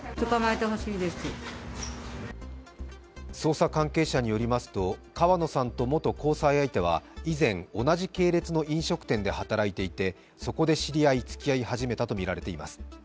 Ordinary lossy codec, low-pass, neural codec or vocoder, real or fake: none; none; none; real